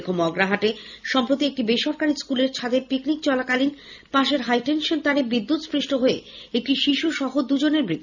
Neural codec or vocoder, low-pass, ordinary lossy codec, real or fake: none; 7.2 kHz; none; real